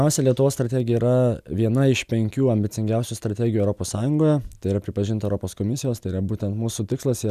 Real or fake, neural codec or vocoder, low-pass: real; none; 14.4 kHz